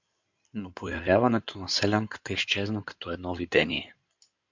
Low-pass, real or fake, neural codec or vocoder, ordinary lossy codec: 7.2 kHz; fake; codec, 16 kHz in and 24 kHz out, 2.2 kbps, FireRedTTS-2 codec; MP3, 64 kbps